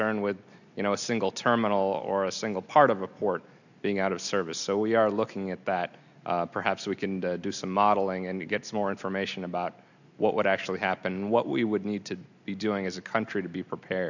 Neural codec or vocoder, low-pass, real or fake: none; 7.2 kHz; real